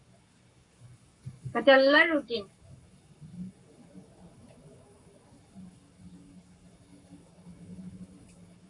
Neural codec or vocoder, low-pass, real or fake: codec, 44.1 kHz, 7.8 kbps, Pupu-Codec; 10.8 kHz; fake